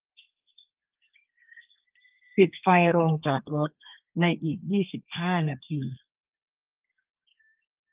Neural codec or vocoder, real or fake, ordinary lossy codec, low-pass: codec, 32 kHz, 1.9 kbps, SNAC; fake; Opus, 24 kbps; 3.6 kHz